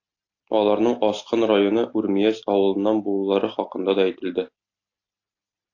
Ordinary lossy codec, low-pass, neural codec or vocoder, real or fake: AAC, 48 kbps; 7.2 kHz; none; real